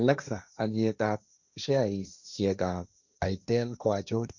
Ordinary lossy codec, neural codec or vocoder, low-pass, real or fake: none; codec, 16 kHz, 1.1 kbps, Voila-Tokenizer; 7.2 kHz; fake